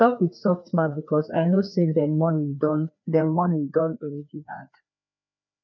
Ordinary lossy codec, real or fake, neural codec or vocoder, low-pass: none; fake; codec, 16 kHz, 2 kbps, FreqCodec, larger model; 7.2 kHz